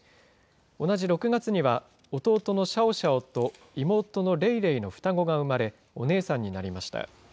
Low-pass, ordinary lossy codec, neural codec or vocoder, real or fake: none; none; none; real